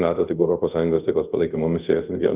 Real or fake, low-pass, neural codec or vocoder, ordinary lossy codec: fake; 3.6 kHz; codec, 24 kHz, 0.5 kbps, DualCodec; Opus, 24 kbps